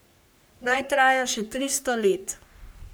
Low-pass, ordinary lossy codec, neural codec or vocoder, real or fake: none; none; codec, 44.1 kHz, 3.4 kbps, Pupu-Codec; fake